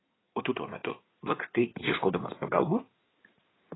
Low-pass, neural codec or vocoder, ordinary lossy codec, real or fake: 7.2 kHz; codec, 16 kHz in and 24 kHz out, 2.2 kbps, FireRedTTS-2 codec; AAC, 16 kbps; fake